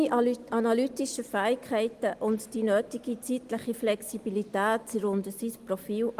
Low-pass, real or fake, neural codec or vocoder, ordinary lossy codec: 14.4 kHz; real; none; Opus, 24 kbps